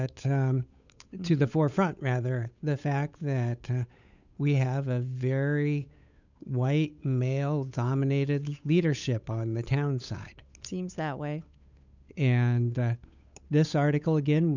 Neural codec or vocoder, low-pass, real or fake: codec, 16 kHz, 8 kbps, FunCodec, trained on Chinese and English, 25 frames a second; 7.2 kHz; fake